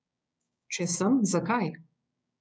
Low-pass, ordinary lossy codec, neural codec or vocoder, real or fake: none; none; codec, 16 kHz, 6 kbps, DAC; fake